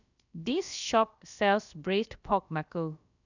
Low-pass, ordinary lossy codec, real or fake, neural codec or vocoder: 7.2 kHz; none; fake; codec, 16 kHz, about 1 kbps, DyCAST, with the encoder's durations